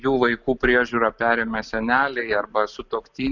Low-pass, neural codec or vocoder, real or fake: 7.2 kHz; none; real